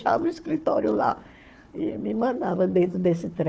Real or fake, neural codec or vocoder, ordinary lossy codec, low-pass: fake; codec, 16 kHz, 4 kbps, FunCodec, trained on Chinese and English, 50 frames a second; none; none